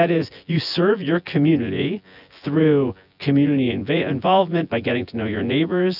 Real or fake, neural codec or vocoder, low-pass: fake; vocoder, 24 kHz, 100 mel bands, Vocos; 5.4 kHz